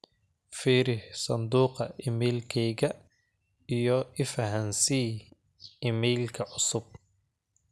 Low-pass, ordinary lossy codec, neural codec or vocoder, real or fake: none; none; none; real